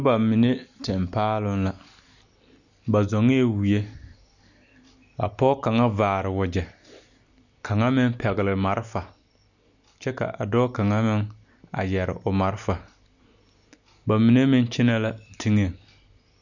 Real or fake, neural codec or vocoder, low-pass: real; none; 7.2 kHz